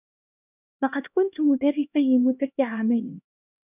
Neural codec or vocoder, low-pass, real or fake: codec, 16 kHz, 1 kbps, X-Codec, HuBERT features, trained on LibriSpeech; 3.6 kHz; fake